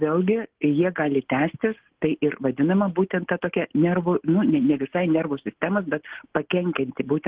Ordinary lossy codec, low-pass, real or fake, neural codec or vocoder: Opus, 16 kbps; 3.6 kHz; real; none